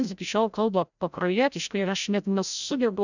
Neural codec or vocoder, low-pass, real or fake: codec, 16 kHz, 0.5 kbps, FreqCodec, larger model; 7.2 kHz; fake